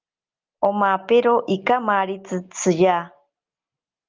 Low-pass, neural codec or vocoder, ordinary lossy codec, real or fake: 7.2 kHz; none; Opus, 24 kbps; real